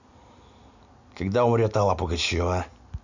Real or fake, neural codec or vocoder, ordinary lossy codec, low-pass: real; none; none; 7.2 kHz